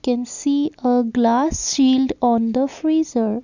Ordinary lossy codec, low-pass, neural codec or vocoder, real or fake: none; 7.2 kHz; none; real